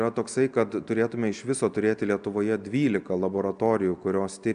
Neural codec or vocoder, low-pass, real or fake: none; 9.9 kHz; real